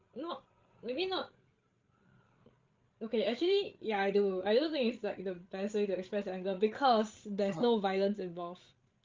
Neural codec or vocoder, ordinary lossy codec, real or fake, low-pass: codec, 16 kHz, 8 kbps, FreqCodec, larger model; Opus, 24 kbps; fake; 7.2 kHz